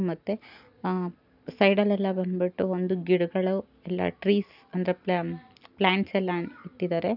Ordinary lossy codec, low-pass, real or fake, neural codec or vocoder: none; 5.4 kHz; real; none